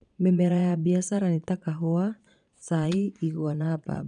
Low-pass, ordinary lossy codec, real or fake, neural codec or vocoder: 9.9 kHz; none; fake; vocoder, 22.05 kHz, 80 mel bands, Vocos